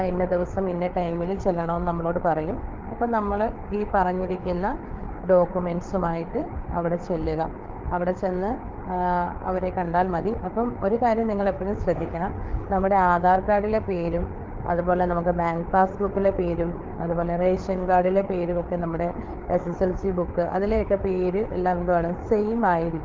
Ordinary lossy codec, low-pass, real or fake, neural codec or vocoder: Opus, 32 kbps; 7.2 kHz; fake; codec, 16 kHz, 4 kbps, FreqCodec, larger model